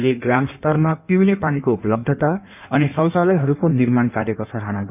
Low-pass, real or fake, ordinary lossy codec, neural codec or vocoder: 3.6 kHz; fake; none; codec, 16 kHz in and 24 kHz out, 1.1 kbps, FireRedTTS-2 codec